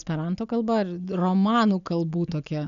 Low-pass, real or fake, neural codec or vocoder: 7.2 kHz; real; none